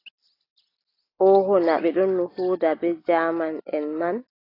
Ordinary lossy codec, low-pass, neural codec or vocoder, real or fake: AAC, 24 kbps; 5.4 kHz; none; real